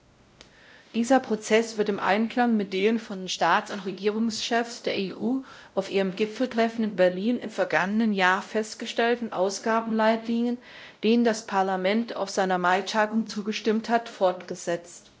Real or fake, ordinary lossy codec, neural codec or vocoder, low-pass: fake; none; codec, 16 kHz, 0.5 kbps, X-Codec, WavLM features, trained on Multilingual LibriSpeech; none